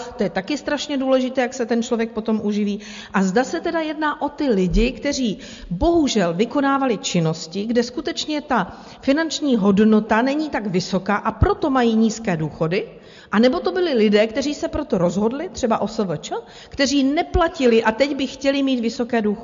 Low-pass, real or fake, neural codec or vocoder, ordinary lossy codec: 7.2 kHz; real; none; MP3, 48 kbps